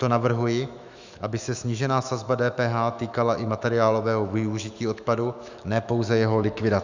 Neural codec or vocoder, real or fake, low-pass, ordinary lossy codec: autoencoder, 48 kHz, 128 numbers a frame, DAC-VAE, trained on Japanese speech; fake; 7.2 kHz; Opus, 64 kbps